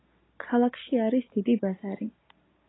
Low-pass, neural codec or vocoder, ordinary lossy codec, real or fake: 7.2 kHz; none; AAC, 16 kbps; real